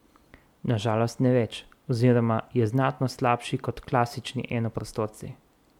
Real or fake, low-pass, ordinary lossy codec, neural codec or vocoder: real; 19.8 kHz; MP3, 96 kbps; none